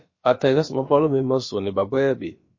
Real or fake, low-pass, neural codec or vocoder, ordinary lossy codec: fake; 7.2 kHz; codec, 16 kHz, about 1 kbps, DyCAST, with the encoder's durations; MP3, 32 kbps